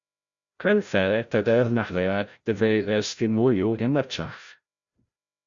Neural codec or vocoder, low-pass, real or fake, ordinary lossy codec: codec, 16 kHz, 0.5 kbps, FreqCodec, larger model; 7.2 kHz; fake; Opus, 64 kbps